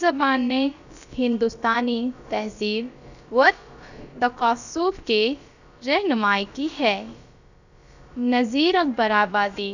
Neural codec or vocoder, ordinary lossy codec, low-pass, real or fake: codec, 16 kHz, about 1 kbps, DyCAST, with the encoder's durations; none; 7.2 kHz; fake